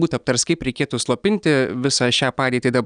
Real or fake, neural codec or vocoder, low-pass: real; none; 9.9 kHz